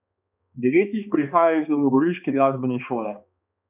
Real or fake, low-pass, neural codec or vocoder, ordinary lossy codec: fake; 3.6 kHz; codec, 16 kHz, 2 kbps, X-Codec, HuBERT features, trained on balanced general audio; none